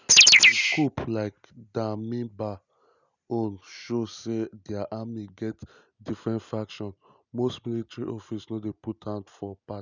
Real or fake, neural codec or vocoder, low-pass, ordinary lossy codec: real; none; 7.2 kHz; none